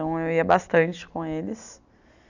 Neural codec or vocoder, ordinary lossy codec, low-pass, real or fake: none; none; 7.2 kHz; real